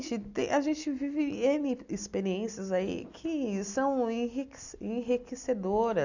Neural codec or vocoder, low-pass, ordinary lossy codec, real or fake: none; 7.2 kHz; none; real